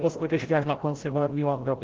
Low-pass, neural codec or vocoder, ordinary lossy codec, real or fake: 7.2 kHz; codec, 16 kHz, 0.5 kbps, FreqCodec, larger model; Opus, 16 kbps; fake